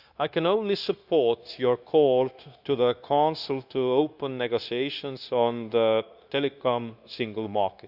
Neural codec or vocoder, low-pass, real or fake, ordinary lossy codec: codec, 16 kHz, 0.9 kbps, LongCat-Audio-Codec; 5.4 kHz; fake; Opus, 64 kbps